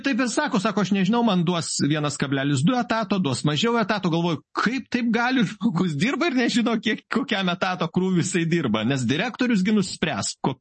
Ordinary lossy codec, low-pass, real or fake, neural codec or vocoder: MP3, 32 kbps; 9.9 kHz; real; none